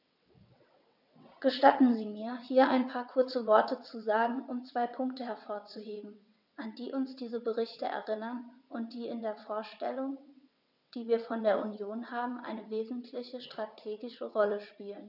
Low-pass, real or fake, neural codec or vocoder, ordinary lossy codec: 5.4 kHz; fake; vocoder, 22.05 kHz, 80 mel bands, WaveNeXt; none